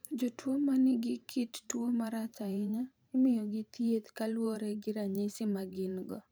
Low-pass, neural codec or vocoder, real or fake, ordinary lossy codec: none; vocoder, 44.1 kHz, 128 mel bands every 512 samples, BigVGAN v2; fake; none